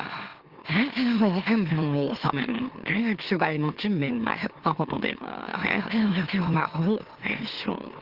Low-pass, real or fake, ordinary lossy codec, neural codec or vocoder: 5.4 kHz; fake; Opus, 16 kbps; autoencoder, 44.1 kHz, a latent of 192 numbers a frame, MeloTTS